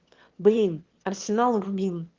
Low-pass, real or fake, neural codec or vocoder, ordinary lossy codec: 7.2 kHz; fake; autoencoder, 22.05 kHz, a latent of 192 numbers a frame, VITS, trained on one speaker; Opus, 16 kbps